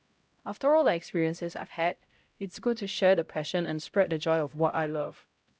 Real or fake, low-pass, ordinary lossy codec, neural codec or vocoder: fake; none; none; codec, 16 kHz, 0.5 kbps, X-Codec, HuBERT features, trained on LibriSpeech